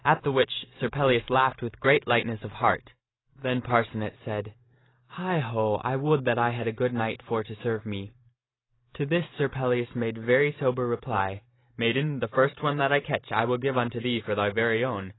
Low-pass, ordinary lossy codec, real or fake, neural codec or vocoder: 7.2 kHz; AAC, 16 kbps; fake; vocoder, 44.1 kHz, 128 mel bands every 512 samples, BigVGAN v2